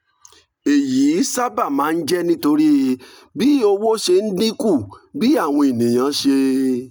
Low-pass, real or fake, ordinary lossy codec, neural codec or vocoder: none; real; none; none